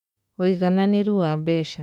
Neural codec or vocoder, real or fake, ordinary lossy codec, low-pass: autoencoder, 48 kHz, 32 numbers a frame, DAC-VAE, trained on Japanese speech; fake; none; 19.8 kHz